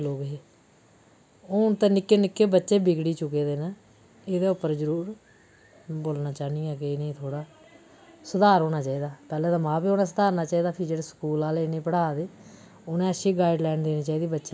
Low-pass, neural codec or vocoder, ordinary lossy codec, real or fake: none; none; none; real